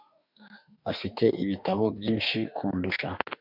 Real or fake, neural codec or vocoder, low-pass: fake; autoencoder, 48 kHz, 32 numbers a frame, DAC-VAE, trained on Japanese speech; 5.4 kHz